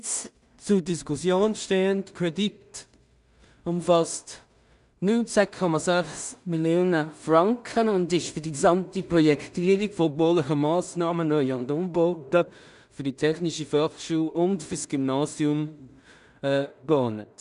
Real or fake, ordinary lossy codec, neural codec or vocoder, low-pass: fake; none; codec, 16 kHz in and 24 kHz out, 0.4 kbps, LongCat-Audio-Codec, two codebook decoder; 10.8 kHz